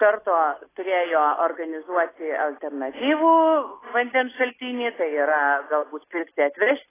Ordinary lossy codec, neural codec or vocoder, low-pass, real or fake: AAC, 16 kbps; none; 3.6 kHz; real